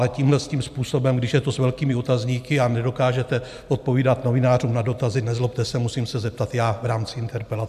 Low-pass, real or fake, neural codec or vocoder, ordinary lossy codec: 14.4 kHz; real; none; MP3, 96 kbps